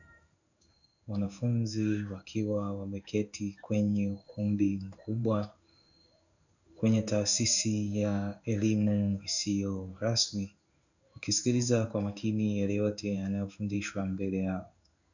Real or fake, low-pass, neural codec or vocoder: fake; 7.2 kHz; codec, 16 kHz in and 24 kHz out, 1 kbps, XY-Tokenizer